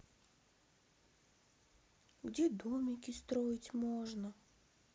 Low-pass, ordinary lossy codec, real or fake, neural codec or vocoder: none; none; real; none